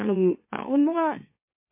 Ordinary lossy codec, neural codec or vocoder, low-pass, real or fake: MP3, 24 kbps; autoencoder, 44.1 kHz, a latent of 192 numbers a frame, MeloTTS; 3.6 kHz; fake